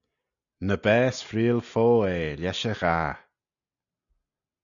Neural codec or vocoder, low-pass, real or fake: none; 7.2 kHz; real